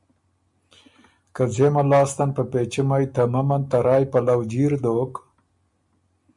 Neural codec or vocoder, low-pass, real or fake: none; 10.8 kHz; real